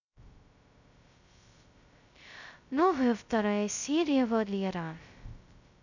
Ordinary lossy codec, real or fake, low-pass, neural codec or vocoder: none; fake; 7.2 kHz; codec, 16 kHz, 0.2 kbps, FocalCodec